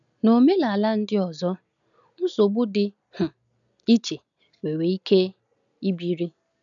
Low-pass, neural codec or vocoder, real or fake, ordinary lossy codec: 7.2 kHz; none; real; none